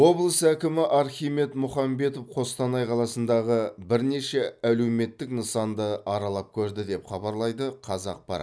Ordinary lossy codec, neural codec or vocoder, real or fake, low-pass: none; none; real; none